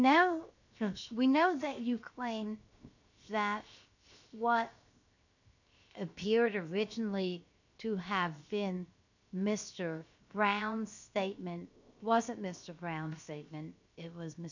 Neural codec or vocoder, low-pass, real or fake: codec, 16 kHz, 0.7 kbps, FocalCodec; 7.2 kHz; fake